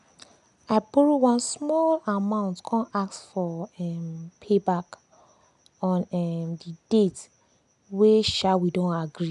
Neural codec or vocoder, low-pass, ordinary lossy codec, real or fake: none; 10.8 kHz; none; real